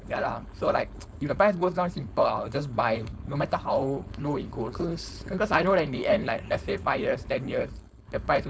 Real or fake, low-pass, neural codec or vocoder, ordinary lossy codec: fake; none; codec, 16 kHz, 4.8 kbps, FACodec; none